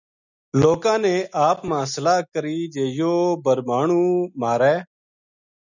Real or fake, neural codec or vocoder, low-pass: real; none; 7.2 kHz